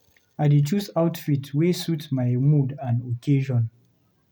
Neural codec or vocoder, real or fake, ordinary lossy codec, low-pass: none; real; none; none